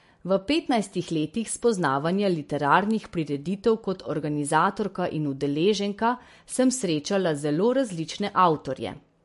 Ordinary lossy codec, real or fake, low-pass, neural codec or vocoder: MP3, 48 kbps; real; 14.4 kHz; none